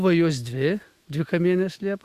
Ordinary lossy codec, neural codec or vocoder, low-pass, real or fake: Opus, 64 kbps; autoencoder, 48 kHz, 128 numbers a frame, DAC-VAE, trained on Japanese speech; 14.4 kHz; fake